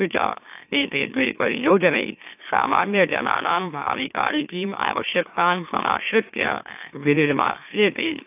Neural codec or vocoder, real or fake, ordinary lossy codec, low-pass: autoencoder, 44.1 kHz, a latent of 192 numbers a frame, MeloTTS; fake; AAC, 32 kbps; 3.6 kHz